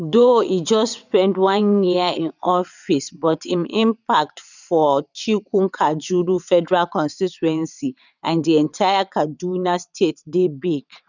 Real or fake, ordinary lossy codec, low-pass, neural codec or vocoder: fake; none; 7.2 kHz; vocoder, 22.05 kHz, 80 mel bands, WaveNeXt